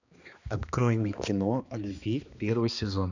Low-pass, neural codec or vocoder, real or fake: 7.2 kHz; codec, 16 kHz, 2 kbps, X-Codec, HuBERT features, trained on balanced general audio; fake